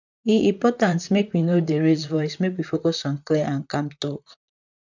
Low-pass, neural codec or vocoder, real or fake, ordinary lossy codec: 7.2 kHz; vocoder, 44.1 kHz, 128 mel bands, Pupu-Vocoder; fake; none